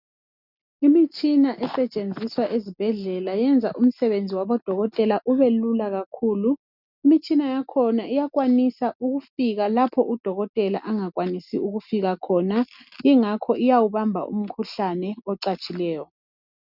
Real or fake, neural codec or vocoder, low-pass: real; none; 5.4 kHz